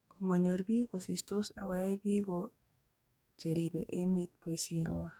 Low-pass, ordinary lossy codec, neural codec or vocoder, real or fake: 19.8 kHz; none; codec, 44.1 kHz, 2.6 kbps, DAC; fake